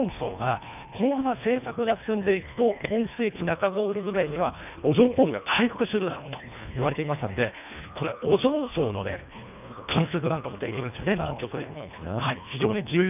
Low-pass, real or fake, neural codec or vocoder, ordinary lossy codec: 3.6 kHz; fake; codec, 24 kHz, 1.5 kbps, HILCodec; none